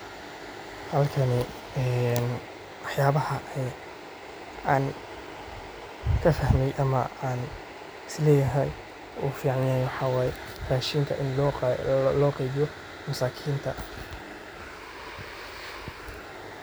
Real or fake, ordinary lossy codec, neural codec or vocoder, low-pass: real; none; none; none